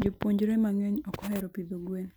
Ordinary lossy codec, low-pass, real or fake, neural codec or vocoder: none; none; real; none